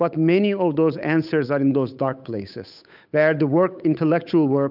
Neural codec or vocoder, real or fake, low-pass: codec, 16 kHz, 8 kbps, FunCodec, trained on Chinese and English, 25 frames a second; fake; 5.4 kHz